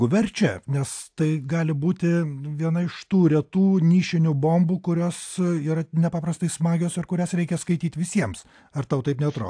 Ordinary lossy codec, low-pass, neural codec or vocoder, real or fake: AAC, 64 kbps; 9.9 kHz; none; real